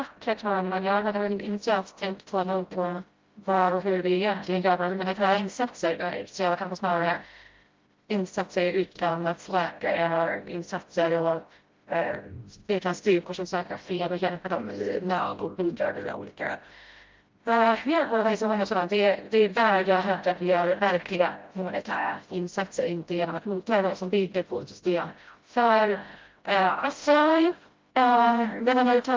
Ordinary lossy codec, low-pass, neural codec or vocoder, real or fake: Opus, 32 kbps; 7.2 kHz; codec, 16 kHz, 0.5 kbps, FreqCodec, smaller model; fake